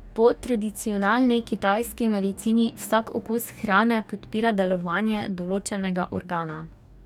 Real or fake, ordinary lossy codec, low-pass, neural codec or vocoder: fake; none; 19.8 kHz; codec, 44.1 kHz, 2.6 kbps, DAC